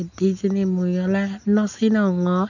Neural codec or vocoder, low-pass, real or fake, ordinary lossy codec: none; 7.2 kHz; real; none